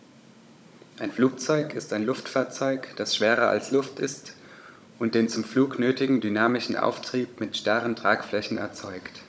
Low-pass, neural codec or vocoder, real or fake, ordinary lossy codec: none; codec, 16 kHz, 16 kbps, FunCodec, trained on Chinese and English, 50 frames a second; fake; none